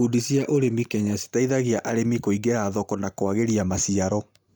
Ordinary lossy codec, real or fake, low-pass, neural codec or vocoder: none; real; none; none